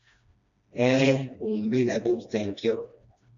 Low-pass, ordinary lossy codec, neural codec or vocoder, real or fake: 7.2 kHz; MP3, 64 kbps; codec, 16 kHz, 1 kbps, FreqCodec, smaller model; fake